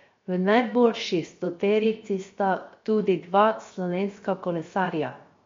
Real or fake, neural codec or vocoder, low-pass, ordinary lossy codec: fake; codec, 16 kHz, 0.7 kbps, FocalCodec; 7.2 kHz; MP3, 48 kbps